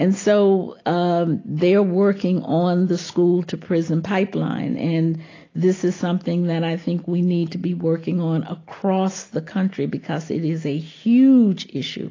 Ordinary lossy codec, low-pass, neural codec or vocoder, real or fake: AAC, 32 kbps; 7.2 kHz; none; real